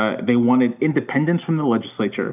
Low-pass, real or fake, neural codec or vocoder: 3.6 kHz; real; none